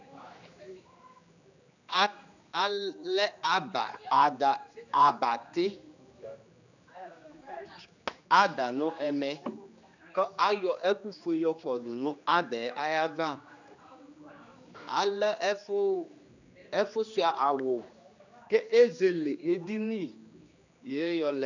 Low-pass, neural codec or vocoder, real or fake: 7.2 kHz; codec, 16 kHz, 2 kbps, X-Codec, HuBERT features, trained on general audio; fake